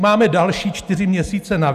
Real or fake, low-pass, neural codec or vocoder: real; 14.4 kHz; none